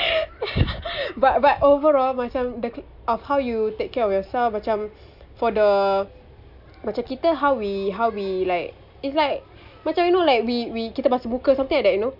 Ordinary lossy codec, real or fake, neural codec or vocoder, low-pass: none; real; none; 5.4 kHz